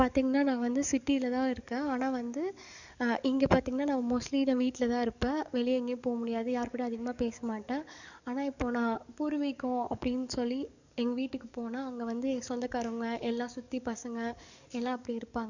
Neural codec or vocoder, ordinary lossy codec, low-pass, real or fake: codec, 44.1 kHz, 7.8 kbps, DAC; none; 7.2 kHz; fake